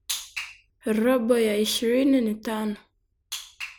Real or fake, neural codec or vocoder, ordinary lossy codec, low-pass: real; none; none; none